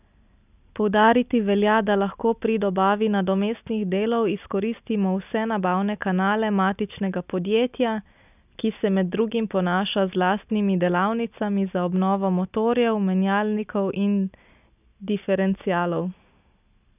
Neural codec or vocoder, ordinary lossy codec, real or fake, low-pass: none; none; real; 3.6 kHz